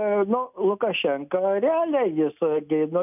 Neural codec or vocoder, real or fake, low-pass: none; real; 3.6 kHz